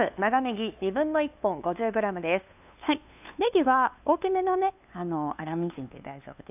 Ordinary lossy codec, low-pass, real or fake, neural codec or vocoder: none; 3.6 kHz; fake; codec, 16 kHz, 2 kbps, FunCodec, trained on LibriTTS, 25 frames a second